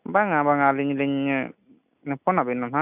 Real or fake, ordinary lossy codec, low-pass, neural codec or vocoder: real; none; 3.6 kHz; none